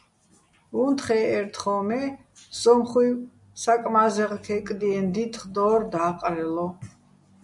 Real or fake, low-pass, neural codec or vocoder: real; 10.8 kHz; none